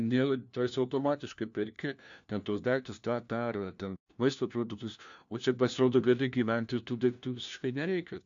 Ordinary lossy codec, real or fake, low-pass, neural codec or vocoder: AAC, 64 kbps; fake; 7.2 kHz; codec, 16 kHz, 1 kbps, FunCodec, trained on LibriTTS, 50 frames a second